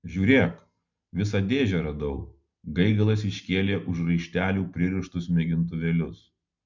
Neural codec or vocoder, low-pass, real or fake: none; 7.2 kHz; real